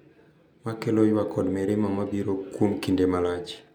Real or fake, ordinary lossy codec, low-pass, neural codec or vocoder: real; none; 19.8 kHz; none